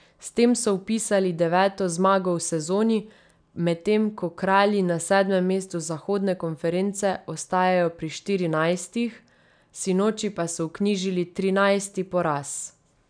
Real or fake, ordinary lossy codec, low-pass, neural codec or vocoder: real; none; 9.9 kHz; none